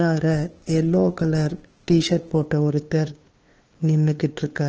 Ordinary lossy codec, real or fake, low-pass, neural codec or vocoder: Opus, 16 kbps; fake; 7.2 kHz; codec, 16 kHz, 2 kbps, FunCodec, trained on LibriTTS, 25 frames a second